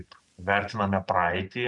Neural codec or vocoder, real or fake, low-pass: vocoder, 44.1 kHz, 128 mel bands every 512 samples, BigVGAN v2; fake; 10.8 kHz